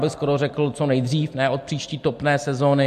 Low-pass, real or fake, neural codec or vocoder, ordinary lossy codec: 14.4 kHz; real; none; MP3, 64 kbps